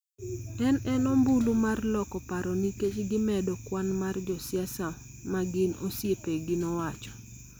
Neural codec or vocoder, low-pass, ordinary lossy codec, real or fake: none; none; none; real